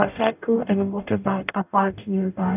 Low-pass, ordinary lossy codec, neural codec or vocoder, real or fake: 3.6 kHz; none; codec, 44.1 kHz, 0.9 kbps, DAC; fake